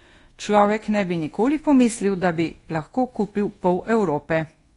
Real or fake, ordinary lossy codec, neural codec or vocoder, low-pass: fake; AAC, 32 kbps; codec, 24 kHz, 1.2 kbps, DualCodec; 10.8 kHz